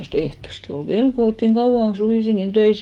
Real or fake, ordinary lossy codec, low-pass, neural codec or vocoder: fake; Opus, 24 kbps; 19.8 kHz; codec, 44.1 kHz, 7.8 kbps, DAC